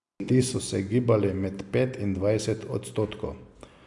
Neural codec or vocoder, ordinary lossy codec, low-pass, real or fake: none; AAC, 64 kbps; 10.8 kHz; real